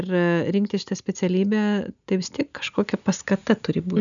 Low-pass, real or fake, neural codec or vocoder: 7.2 kHz; real; none